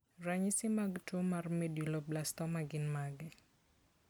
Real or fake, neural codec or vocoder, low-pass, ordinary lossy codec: real; none; none; none